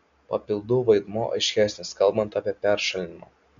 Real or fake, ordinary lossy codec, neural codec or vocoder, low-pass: real; MP3, 64 kbps; none; 7.2 kHz